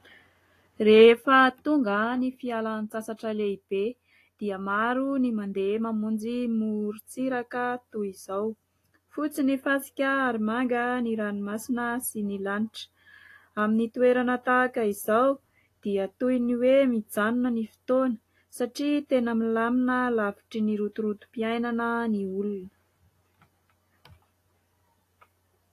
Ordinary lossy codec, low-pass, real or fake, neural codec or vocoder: AAC, 48 kbps; 14.4 kHz; real; none